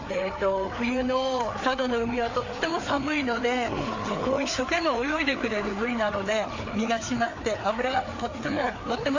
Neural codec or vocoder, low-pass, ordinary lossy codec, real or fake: codec, 16 kHz, 4 kbps, FreqCodec, larger model; 7.2 kHz; AAC, 48 kbps; fake